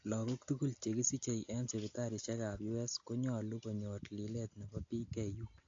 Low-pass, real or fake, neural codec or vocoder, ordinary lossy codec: 7.2 kHz; real; none; AAC, 48 kbps